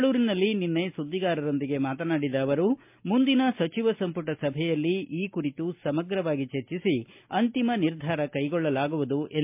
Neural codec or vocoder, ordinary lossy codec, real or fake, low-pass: none; MP3, 32 kbps; real; 3.6 kHz